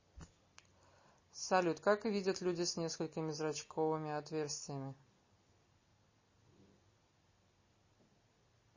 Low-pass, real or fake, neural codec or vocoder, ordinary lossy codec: 7.2 kHz; real; none; MP3, 32 kbps